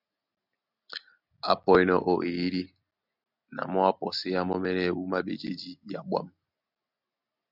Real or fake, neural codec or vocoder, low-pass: real; none; 5.4 kHz